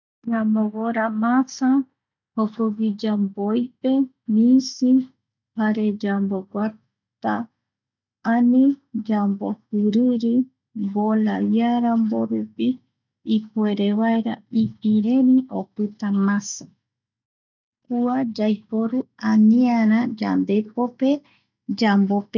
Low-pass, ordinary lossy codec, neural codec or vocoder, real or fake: 7.2 kHz; none; none; real